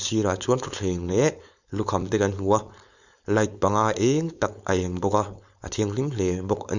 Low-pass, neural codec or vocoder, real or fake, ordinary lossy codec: 7.2 kHz; codec, 16 kHz, 4.8 kbps, FACodec; fake; none